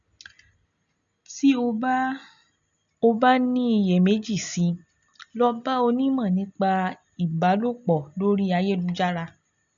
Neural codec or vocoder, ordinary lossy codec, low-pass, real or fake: none; none; 7.2 kHz; real